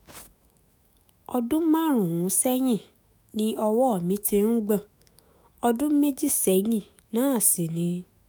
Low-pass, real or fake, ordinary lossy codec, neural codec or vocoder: none; fake; none; autoencoder, 48 kHz, 128 numbers a frame, DAC-VAE, trained on Japanese speech